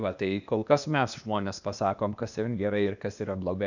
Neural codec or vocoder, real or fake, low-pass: codec, 16 kHz, 0.8 kbps, ZipCodec; fake; 7.2 kHz